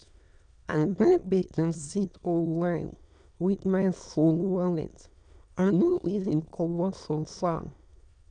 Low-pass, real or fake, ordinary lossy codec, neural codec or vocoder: 9.9 kHz; fake; none; autoencoder, 22.05 kHz, a latent of 192 numbers a frame, VITS, trained on many speakers